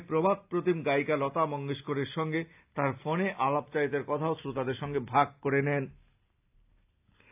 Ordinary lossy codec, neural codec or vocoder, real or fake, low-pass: AAC, 32 kbps; none; real; 3.6 kHz